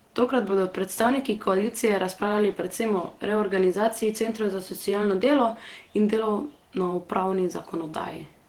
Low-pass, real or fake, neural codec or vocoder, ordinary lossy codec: 19.8 kHz; fake; vocoder, 48 kHz, 128 mel bands, Vocos; Opus, 16 kbps